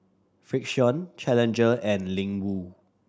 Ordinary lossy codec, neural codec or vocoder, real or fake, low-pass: none; none; real; none